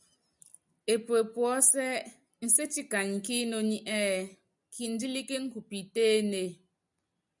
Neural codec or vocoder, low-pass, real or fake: none; 10.8 kHz; real